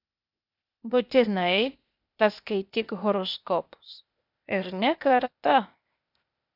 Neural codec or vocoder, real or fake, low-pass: codec, 16 kHz, 0.8 kbps, ZipCodec; fake; 5.4 kHz